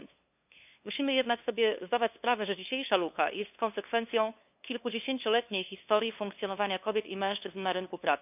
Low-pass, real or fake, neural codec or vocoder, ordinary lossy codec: 3.6 kHz; fake; codec, 16 kHz, 2 kbps, FunCodec, trained on LibriTTS, 25 frames a second; none